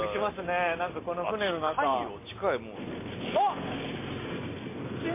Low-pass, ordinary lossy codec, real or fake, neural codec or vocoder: 3.6 kHz; MP3, 24 kbps; real; none